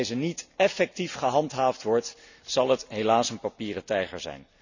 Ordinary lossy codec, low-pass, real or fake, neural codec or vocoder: none; 7.2 kHz; real; none